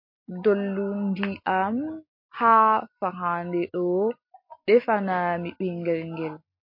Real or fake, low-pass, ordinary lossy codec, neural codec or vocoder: real; 5.4 kHz; MP3, 32 kbps; none